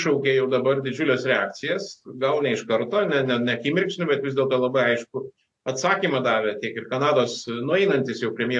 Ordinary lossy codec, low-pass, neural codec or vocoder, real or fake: AAC, 64 kbps; 10.8 kHz; none; real